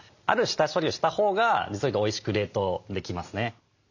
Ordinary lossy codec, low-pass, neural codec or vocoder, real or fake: none; 7.2 kHz; none; real